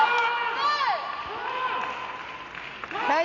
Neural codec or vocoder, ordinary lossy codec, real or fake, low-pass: none; none; real; 7.2 kHz